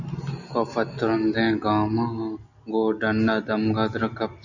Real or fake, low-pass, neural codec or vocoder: real; 7.2 kHz; none